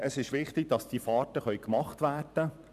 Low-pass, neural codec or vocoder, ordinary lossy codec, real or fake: 14.4 kHz; vocoder, 44.1 kHz, 128 mel bands every 512 samples, BigVGAN v2; none; fake